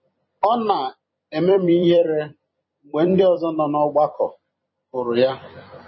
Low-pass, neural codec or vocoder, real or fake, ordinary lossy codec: 7.2 kHz; vocoder, 44.1 kHz, 128 mel bands every 256 samples, BigVGAN v2; fake; MP3, 24 kbps